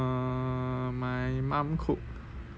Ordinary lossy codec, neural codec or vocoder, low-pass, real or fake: none; none; none; real